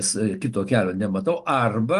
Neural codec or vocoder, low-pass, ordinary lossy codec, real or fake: none; 10.8 kHz; Opus, 32 kbps; real